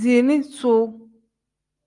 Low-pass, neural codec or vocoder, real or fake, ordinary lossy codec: 10.8 kHz; none; real; Opus, 32 kbps